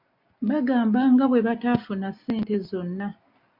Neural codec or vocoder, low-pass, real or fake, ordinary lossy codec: none; 5.4 kHz; real; AAC, 48 kbps